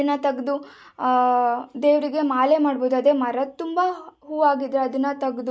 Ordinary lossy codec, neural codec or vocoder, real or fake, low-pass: none; none; real; none